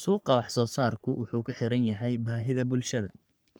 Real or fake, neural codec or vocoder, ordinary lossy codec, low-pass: fake; codec, 44.1 kHz, 3.4 kbps, Pupu-Codec; none; none